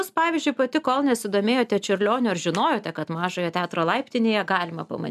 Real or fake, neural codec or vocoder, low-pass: real; none; 14.4 kHz